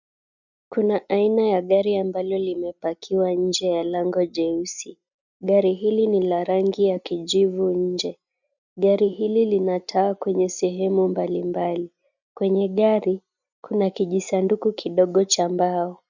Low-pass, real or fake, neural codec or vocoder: 7.2 kHz; real; none